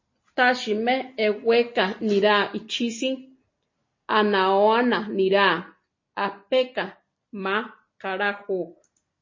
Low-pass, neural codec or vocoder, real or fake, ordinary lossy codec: 7.2 kHz; none; real; MP3, 32 kbps